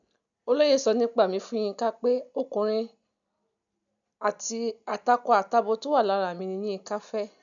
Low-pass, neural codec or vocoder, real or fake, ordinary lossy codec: 7.2 kHz; none; real; none